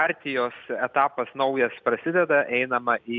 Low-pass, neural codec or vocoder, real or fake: 7.2 kHz; none; real